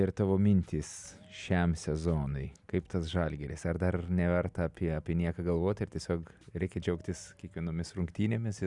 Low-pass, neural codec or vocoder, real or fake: 10.8 kHz; none; real